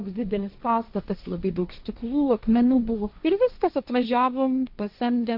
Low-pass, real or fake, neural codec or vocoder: 5.4 kHz; fake; codec, 16 kHz, 1.1 kbps, Voila-Tokenizer